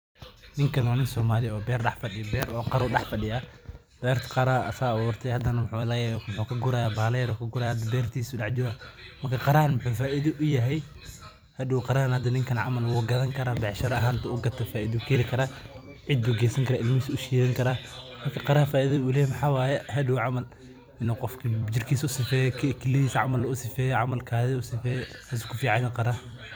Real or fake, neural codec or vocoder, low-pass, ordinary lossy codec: fake; vocoder, 44.1 kHz, 128 mel bands every 256 samples, BigVGAN v2; none; none